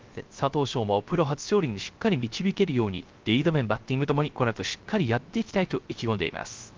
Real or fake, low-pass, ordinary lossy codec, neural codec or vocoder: fake; 7.2 kHz; Opus, 32 kbps; codec, 16 kHz, 0.3 kbps, FocalCodec